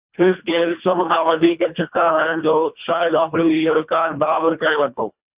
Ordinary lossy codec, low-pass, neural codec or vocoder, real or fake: Opus, 64 kbps; 3.6 kHz; codec, 24 kHz, 1.5 kbps, HILCodec; fake